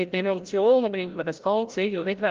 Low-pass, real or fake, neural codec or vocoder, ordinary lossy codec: 7.2 kHz; fake; codec, 16 kHz, 0.5 kbps, FreqCodec, larger model; Opus, 32 kbps